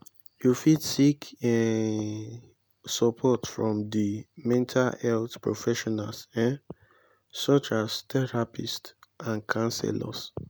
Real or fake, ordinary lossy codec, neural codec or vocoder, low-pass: real; none; none; none